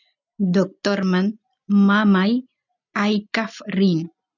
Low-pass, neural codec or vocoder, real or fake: 7.2 kHz; none; real